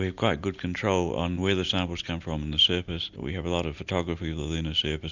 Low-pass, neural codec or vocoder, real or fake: 7.2 kHz; none; real